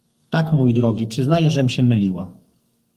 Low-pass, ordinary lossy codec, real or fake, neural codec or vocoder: 14.4 kHz; Opus, 32 kbps; fake; codec, 44.1 kHz, 2.6 kbps, SNAC